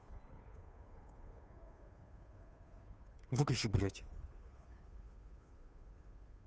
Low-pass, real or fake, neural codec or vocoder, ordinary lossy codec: none; fake; codec, 16 kHz, 2 kbps, FunCodec, trained on Chinese and English, 25 frames a second; none